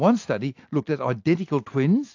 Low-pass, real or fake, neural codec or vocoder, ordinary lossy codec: 7.2 kHz; real; none; AAC, 48 kbps